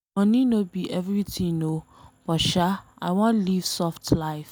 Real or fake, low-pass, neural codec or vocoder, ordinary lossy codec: real; none; none; none